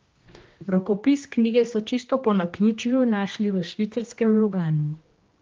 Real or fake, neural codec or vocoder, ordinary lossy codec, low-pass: fake; codec, 16 kHz, 1 kbps, X-Codec, HuBERT features, trained on general audio; Opus, 32 kbps; 7.2 kHz